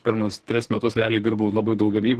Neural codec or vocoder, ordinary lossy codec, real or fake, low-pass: codec, 44.1 kHz, 2.6 kbps, SNAC; Opus, 16 kbps; fake; 14.4 kHz